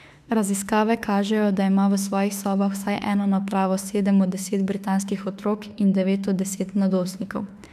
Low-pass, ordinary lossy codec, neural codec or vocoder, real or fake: 14.4 kHz; none; autoencoder, 48 kHz, 32 numbers a frame, DAC-VAE, trained on Japanese speech; fake